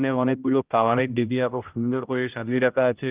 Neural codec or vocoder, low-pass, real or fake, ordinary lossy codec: codec, 16 kHz, 0.5 kbps, X-Codec, HuBERT features, trained on general audio; 3.6 kHz; fake; Opus, 24 kbps